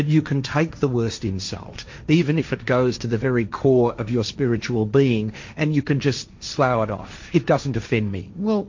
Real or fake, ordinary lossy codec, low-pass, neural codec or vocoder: fake; MP3, 48 kbps; 7.2 kHz; codec, 16 kHz, 1.1 kbps, Voila-Tokenizer